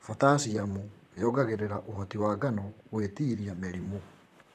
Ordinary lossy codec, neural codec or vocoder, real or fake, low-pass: none; vocoder, 44.1 kHz, 128 mel bands, Pupu-Vocoder; fake; 14.4 kHz